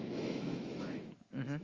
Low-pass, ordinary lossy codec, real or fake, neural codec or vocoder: 7.2 kHz; Opus, 32 kbps; fake; autoencoder, 48 kHz, 128 numbers a frame, DAC-VAE, trained on Japanese speech